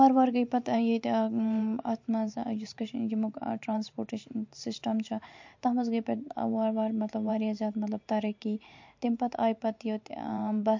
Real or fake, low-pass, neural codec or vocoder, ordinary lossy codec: fake; 7.2 kHz; vocoder, 44.1 kHz, 128 mel bands every 512 samples, BigVGAN v2; MP3, 48 kbps